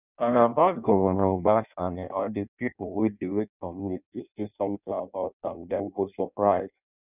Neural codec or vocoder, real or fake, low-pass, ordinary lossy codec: codec, 16 kHz in and 24 kHz out, 0.6 kbps, FireRedTTS-2 codec; fake; 3.6 kHz; none